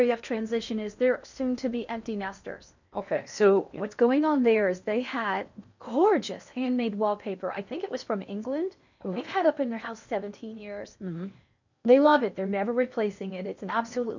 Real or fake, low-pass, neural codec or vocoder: fake; 7.2 kHz; codec, 16 kHz in and 24 kHz out, 0.8 kbps, FocalCodec, streaming, 65536 codes